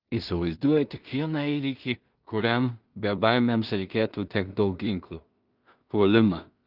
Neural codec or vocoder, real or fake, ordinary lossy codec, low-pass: codec, 16 kHz in and 24 kHz out, 0.4 kbps, LongCat-Audio-Codec, two codebook decoder; fake; Opus, 24 kbps; 5.4 kHz